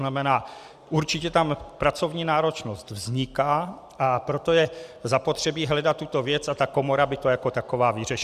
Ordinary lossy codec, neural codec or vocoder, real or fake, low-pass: Opus, 64 kbps; none; real; 14.4 kHz